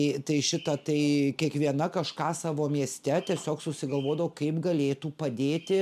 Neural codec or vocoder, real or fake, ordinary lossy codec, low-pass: none; real; MP3, 96 kbps; 14.4 kHz